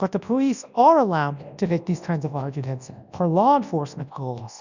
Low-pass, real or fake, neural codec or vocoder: 7.2 kHz; fake; codec, 24 kHz, 0.9 kbps, WavTokenizer, large speech release